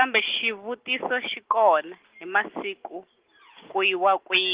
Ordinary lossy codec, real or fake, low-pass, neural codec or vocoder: Opus, 16 kbps; real; 3.6 kHz; none